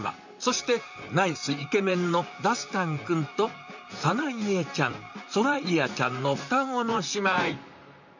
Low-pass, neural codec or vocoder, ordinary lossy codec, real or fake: 7.2 kHz; vocoder, 44.1 kHz, 128 mel bands, Pupu-Vocoder; none; fake